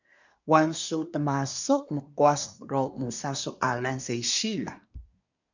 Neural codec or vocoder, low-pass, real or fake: codec, 24 kHz, 1 kbps, SNAC; 7.2 kHz; fake